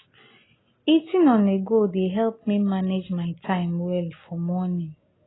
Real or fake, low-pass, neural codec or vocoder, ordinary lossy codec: real; 7.2 kHz; none; AAC, 16 kbps